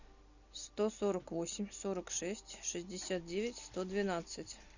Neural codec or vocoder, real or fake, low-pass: none; real; 7.2 kHz